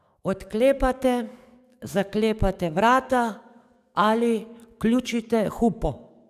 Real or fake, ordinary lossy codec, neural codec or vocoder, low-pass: fake; none; codec, 44.1 kHz, 7.8 kbps, DAC; 14.4 kHz